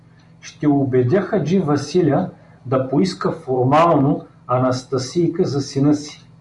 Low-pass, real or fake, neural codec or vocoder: 10.8 kHz; real; none